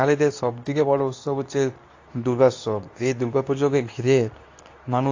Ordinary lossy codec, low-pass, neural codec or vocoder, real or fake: AAC, 48 kbps; 7.2 kHz; codec, 24 kHz, 0.9 kbps, WavTokenizer, medium speech release version 2; fake